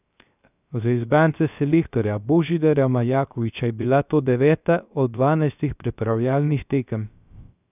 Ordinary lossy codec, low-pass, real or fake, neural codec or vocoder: none; 3.6 kHz; fake; codec, 16 kHz, 0.3 kbps, FocalCodec